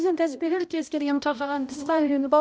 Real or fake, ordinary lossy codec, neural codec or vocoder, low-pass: fake; none; codec, 16 kHz, 0.5 kbps, X-Codec, HuBERT features, trained on balanced general audio; none